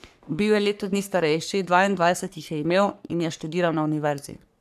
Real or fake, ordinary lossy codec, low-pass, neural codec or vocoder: fake; none; 14.4 kHz; codec, 44.1 kHz, 3.4 kbps, Pupu-Codec